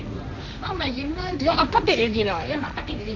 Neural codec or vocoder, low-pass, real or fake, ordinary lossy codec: codec, 16 kHz, 1.1 kbps, Voila-Tokenizer; 7.2 kHz; fake; none